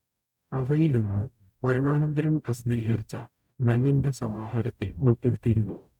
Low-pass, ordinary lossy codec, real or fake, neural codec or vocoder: 19.8 kHz; none; fake; codec, 44.1 kHz, 0.9 kbps, DAC